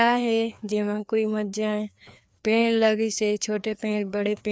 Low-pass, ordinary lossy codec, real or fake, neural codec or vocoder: none; none; fake; codec, 16 kHz, 2 kbps, FreqCodec, larger model